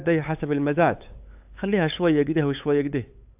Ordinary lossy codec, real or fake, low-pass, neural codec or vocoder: AAC, 32 kbps; fake; 3.6 kHz; codec, 16 kHz, 8 kbps, FunCodec, trained on LibriTTS, 25 frames a second